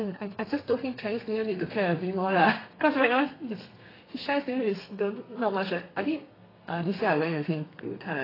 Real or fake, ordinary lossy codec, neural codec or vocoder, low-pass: fake; AAC, 24 kbps; codec, 32 kHz, 1.9 kbps, SNAC; 5.4 kHz